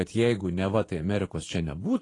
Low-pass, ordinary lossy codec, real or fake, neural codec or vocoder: 10.8 kHz; AAC, 32 kbps; fake; vocoder, 24 kHz, 100 mel bands, Vocos